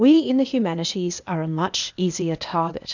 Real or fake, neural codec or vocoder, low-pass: fake; codec, 16 kHz, 0.8 kbps, ZipCodec; 7.2 kHz